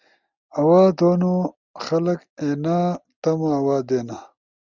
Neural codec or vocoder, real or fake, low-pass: none; real; 7.2 kHz